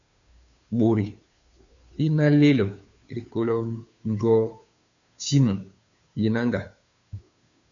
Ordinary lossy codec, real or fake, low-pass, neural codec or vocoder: MP3, 96 kbps; fake; 7.2 kHz; codec, 16 kHz, 2 kbps, FunCodec, trained on Chinese and English, 25 frames a second